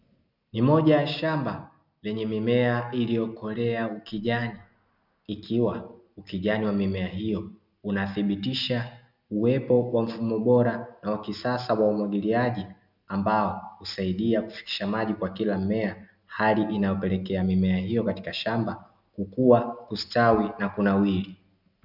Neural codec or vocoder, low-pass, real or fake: none; 5.4 kHz; real